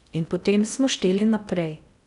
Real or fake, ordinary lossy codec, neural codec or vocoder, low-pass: fake; none; codec, 16 kHz in and 24 kHz out, 0.6 kbps, FocalCodec, streaming, 4096 codes; 10.8 kHz